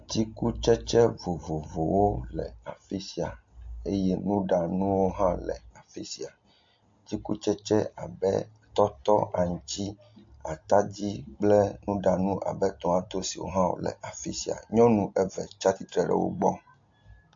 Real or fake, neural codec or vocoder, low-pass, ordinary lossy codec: real; none; 7.2 kHz; MP3, 48 kbps